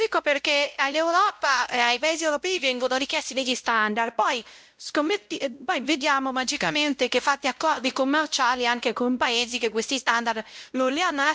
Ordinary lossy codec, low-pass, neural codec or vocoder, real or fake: none; none; codec, 16 kHz, 0.5 kbps, X-Codec, WavLM features, trained on Multilingual LibriSpeech; fake